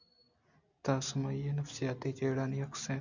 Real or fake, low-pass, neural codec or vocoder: real; 7.2 kHz; none